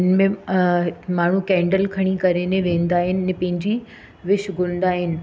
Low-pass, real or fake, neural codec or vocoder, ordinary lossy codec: none; real; none; none